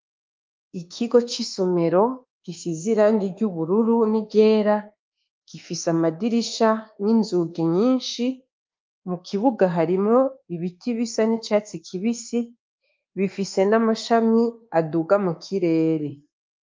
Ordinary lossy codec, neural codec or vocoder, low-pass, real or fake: Opus, 24 kbps; codec, 24 kHz, 1.2 kbps, DualCodec; 7.2 kHz; fake